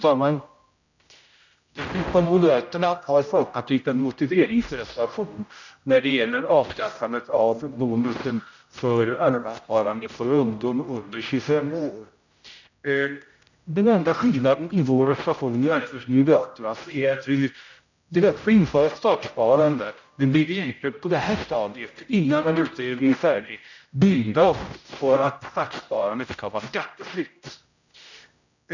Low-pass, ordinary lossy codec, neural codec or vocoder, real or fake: 7.2 kHz; none; codec, 16 kHz, 0.5 kbps, X-Codec, HuBERT features, trained on general audio; fake